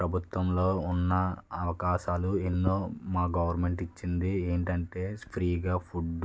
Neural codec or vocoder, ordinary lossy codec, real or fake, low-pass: none; none; real; none